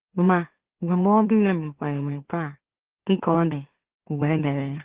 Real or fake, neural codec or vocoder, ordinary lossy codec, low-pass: fake; autoencoder, 44.1 kHz, a latent of 192 numbers a frame, MeloTTS; Opus, 16 kbps; 3.6 kHz